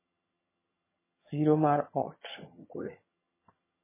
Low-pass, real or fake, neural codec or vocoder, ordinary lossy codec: 3.6 kHz; fake; vocoder, 22.05 kHz, 80 mel bands, HiFi-GAN; MP3, 16 kbps